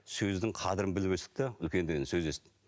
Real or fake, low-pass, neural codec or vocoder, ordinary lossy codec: real; none; none; none